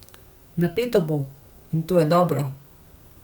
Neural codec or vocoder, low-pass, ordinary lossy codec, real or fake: codec, 44.1 kHz, 2.6 kbps, SNAC; none; none; fake